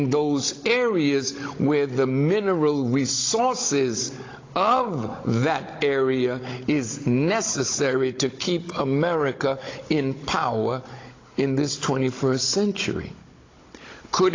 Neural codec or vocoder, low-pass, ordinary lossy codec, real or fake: codec, 16 kHz, 16 kbps, FunCodec, trained on Chinese and English, 50 frames a second; 7.2 kHz; AAC, 32 kbps; fake